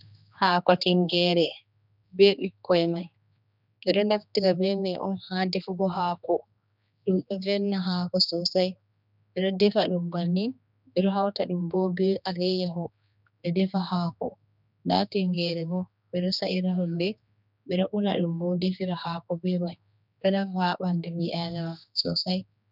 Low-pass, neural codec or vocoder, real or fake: 5.4 kHz; codec, 16 kHz, 2 kbps, X-Codec, HuBERT features, trained on general audio; fake